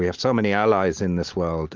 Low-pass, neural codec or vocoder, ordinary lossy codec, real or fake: 7.2 kHz; none; Opus, 24 kbps; real